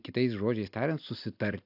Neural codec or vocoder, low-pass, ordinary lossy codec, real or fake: none; 5.4 kHz; AAC, 48 kbps; real